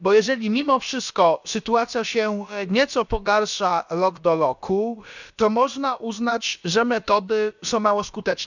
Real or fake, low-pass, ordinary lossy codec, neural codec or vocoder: fake; 7.2 kHz; none; codec, 16 kHz, about 1 kbps, DyCAST, with the encoder's durations